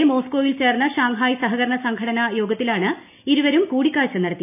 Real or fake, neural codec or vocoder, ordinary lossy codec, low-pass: real; none; none; 3.6 kHz